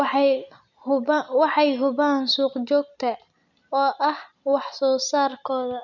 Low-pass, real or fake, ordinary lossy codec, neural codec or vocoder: 7.2 kHz; real; none; none